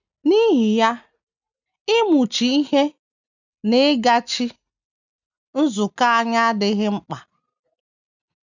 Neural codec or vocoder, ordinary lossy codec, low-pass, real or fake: none; none; 7.2 kHz; real